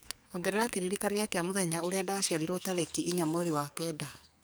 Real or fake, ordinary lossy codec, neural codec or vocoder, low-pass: fake; none; codec, 44.1 kHz, 2.6 kbps, SNAC; none